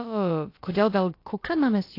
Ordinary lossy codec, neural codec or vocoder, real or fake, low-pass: AAC, 32 kbps; codec, 16 kHz, about 1 kbps, DyCAST, with the encoder's durations; fake; 5.4 kHz